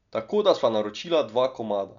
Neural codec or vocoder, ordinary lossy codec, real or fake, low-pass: none; none; real; 7.2 kHz